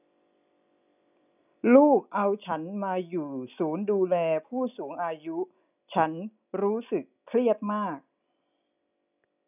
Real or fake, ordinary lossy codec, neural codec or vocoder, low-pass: real; none; none; 3.6 kHz